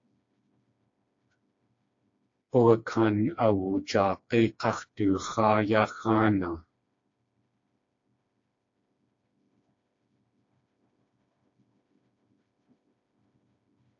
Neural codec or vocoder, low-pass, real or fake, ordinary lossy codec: codec, 16 kHz, 2 kbps, FreqCodec, smaller model; 7.2 kHz; fake; AAC, 48 kbps